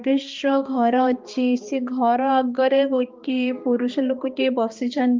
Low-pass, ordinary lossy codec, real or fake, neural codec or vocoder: 7.2 kHz; Opus, 24 kbps; fake; codec, 16 kHz, 2 kbps, X-Codec, HuBERT features, trained on balanced general audio